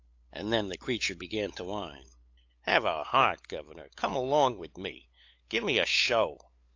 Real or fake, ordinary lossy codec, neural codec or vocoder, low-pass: fake; AAC, 48 kbps; codec, 16 kHz, 8 kbps, FunCodec, trained on LibriTTS, 25 frames a second; 7.2 kHz